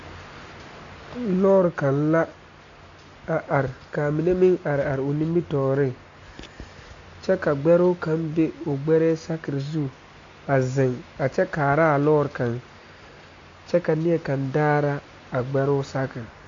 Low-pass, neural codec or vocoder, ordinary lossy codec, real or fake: 7.2 kHz; none; AAC, 48 kbps; real